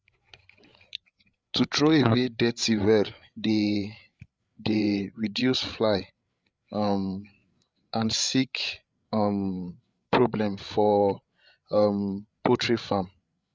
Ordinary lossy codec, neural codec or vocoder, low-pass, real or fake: none; codec, 16 kHz, 8 kbps, FreqCodec, larger model; none; fake